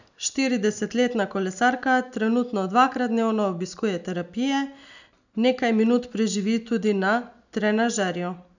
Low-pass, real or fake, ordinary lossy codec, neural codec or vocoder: 7.2 kHz; real; none; none